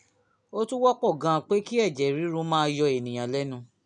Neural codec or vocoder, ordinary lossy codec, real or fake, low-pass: none; none; real; 9.9 kHz